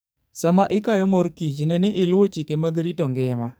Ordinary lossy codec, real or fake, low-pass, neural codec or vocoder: none; fake; none; codec, 44.1 kHz, 2.6 kbps, SNAC